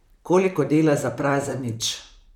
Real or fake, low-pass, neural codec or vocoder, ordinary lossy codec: fake; 19.8 kHz; vocoder, 44.1 kHz, 128 mel bands, Pupu-Vocoder; none